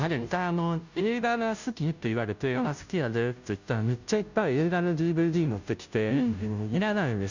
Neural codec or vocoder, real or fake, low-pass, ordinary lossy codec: codec, 16 kHz, 0.5 kbps, FunCodec, trained on Chinese and English, 25 frames a second; fake; 7.2 kHz; none